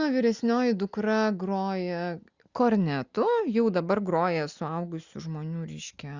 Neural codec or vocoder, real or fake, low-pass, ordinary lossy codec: none; real; 7.2 kHz; Opus, 64 kbps